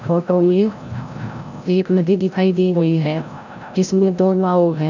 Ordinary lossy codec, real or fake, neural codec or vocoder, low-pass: none; fake; codec, 16 kHz, 0.5 kbps, FreqCodec, larger model; 7.2 kHz